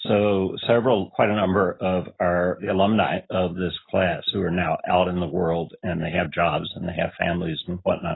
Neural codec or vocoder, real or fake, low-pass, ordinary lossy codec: none; real; 7.2 kHz; AAC, 16 kbps